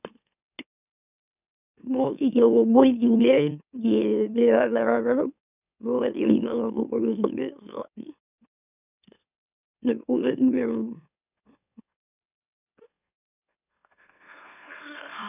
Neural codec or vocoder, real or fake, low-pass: autoencoder, 44.1 kHz, a latent of 192 numbers a frame, MeloTTS; fake; 3.6 kHz